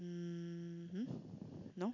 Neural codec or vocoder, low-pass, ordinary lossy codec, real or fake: none; 7.2 kHz; none; real